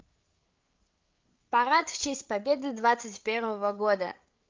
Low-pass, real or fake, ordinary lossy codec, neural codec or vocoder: 7.2 kHz; fake; Opus, 24 kbps; codec, 16 kHz, 8 kbps, FreqCodec, larger model